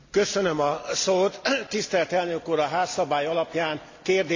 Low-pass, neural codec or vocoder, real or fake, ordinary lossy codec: 7.2 kHz; none; real; AAC, 48 kbps